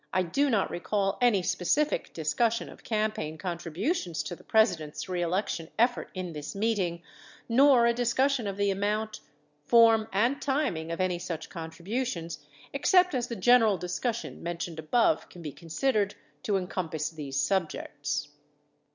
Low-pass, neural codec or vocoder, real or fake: 7.2 kHz; none; real